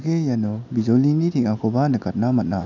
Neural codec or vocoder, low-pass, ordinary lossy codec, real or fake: none; 7.2 kHz; none; real